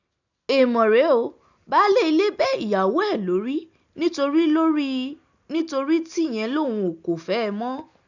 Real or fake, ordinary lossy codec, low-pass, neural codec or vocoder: real; none; 7.2 kHz; none